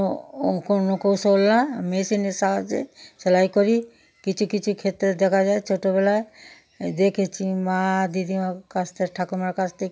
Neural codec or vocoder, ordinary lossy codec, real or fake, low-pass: none; none; real; none